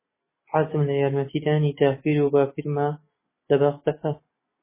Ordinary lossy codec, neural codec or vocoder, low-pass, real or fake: MP3, 16 kbps; none; 3.6 kHz; real